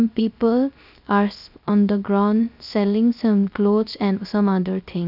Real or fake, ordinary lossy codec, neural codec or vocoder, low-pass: fake; none; codec, 16 kHz, 0.3 kbps, FocalCodec; 5.4 kHz